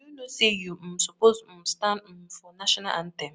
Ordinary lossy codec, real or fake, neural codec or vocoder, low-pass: none; real; none; none